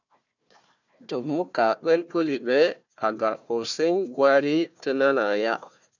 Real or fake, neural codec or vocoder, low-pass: fake; codec, 16 kHz, 1 kbps, FunCodec, trained on Chinese and English, 50 frames a second; 7.2 kHz